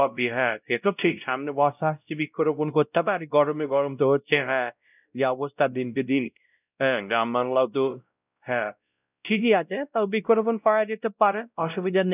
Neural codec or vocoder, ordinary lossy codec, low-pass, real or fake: codec, 16 kHz, 0.5 kbps, X-Codec, WavLM features, trained on Multilingual LibriSpeech; none; 3.6 kHz; fake